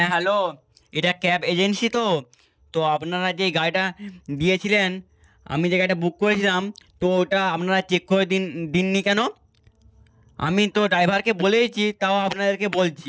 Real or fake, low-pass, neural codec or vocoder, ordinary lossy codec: real; none; none; none